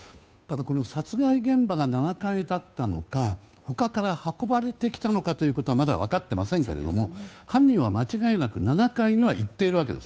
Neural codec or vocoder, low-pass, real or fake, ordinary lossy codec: codec, 16 kHz, 2 kbps, FunCodec, trained on Chinese and English, 25 frames a second; none; fake; none